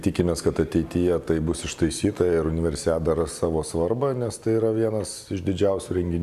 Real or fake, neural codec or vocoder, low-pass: real; none; 14.4 kHz